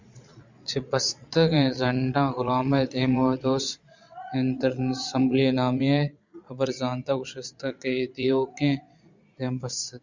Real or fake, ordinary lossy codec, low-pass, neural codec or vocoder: fake; Opus, 64 kbps; 7.2 kHz; vocoder, 22.05 kHz, 80 mel bands, Vocos